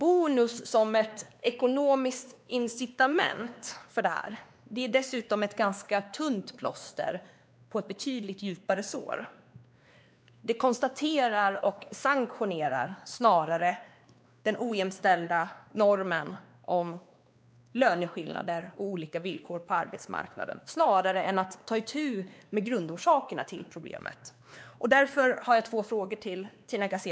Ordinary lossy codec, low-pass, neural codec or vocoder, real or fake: none; none; codec, 16 kHz, 2 kbps, X-Codec, WavLM features, trained on Multilingual LibriSpeech; fake